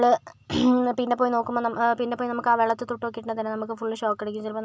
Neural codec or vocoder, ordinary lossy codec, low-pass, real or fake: none; none; none; real